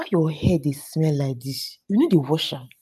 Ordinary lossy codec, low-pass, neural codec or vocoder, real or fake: none; 14.4 kHz; none; real